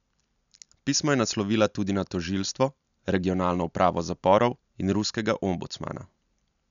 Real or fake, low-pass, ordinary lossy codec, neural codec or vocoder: real; 7.2 kHz; none; none